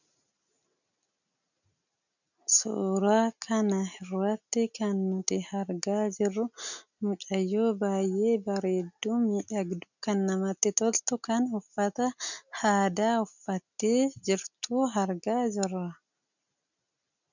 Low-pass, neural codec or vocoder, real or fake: 7.2 kHz; none; real